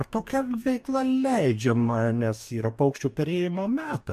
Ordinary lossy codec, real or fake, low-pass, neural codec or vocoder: AAC, 96 kbps; fake; 14.4 kHz; codec, 44.1 kHz, 2.6 kbps, DAC